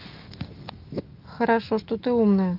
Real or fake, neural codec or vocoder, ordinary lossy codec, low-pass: fake; autoencoder, 48 kHz, 128 numbers a frame, DAC-VAE, trained on Japanese speech; Opus, 32 kbps; 5.4 kHz